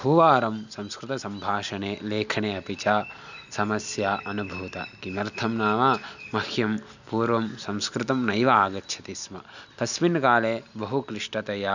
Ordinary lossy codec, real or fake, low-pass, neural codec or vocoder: none; real; 7.2 kHz; none